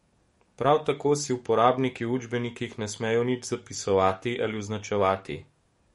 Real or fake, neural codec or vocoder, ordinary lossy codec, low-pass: fake; codec, 44.1 kHz, 7.8 kbps, DAC; MP3, 48 kbps; 19.8 kHz